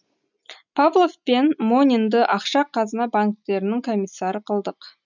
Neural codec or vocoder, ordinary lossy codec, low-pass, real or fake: none; none; 7.2 kHz; real